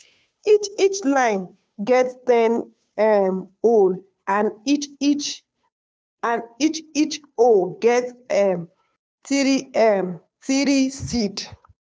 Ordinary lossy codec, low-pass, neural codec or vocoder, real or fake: none; none; codec, 16 kHz, 2 kbps, FunCodec, trained on Chinese and English, 25 frames a second; fake